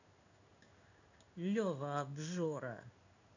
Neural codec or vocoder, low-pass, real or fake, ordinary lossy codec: codec, 16 kHz in and 24 kHz out, 1 kbps, XY-Tokenizer; 7.2 kHz; fake; none